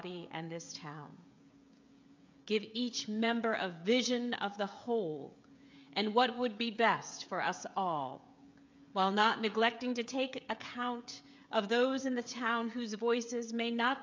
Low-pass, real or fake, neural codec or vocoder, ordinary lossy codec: 7.2 kHz; fake; codec, 16 kHz, 16 kbps, FreqCodec, smaller model; MP3, 64 kbps